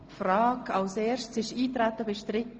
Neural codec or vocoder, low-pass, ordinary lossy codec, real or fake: none; 7.2 kHz; Opus, 24 kbps; real